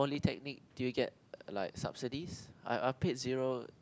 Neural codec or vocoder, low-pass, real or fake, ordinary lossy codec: none; none; real; none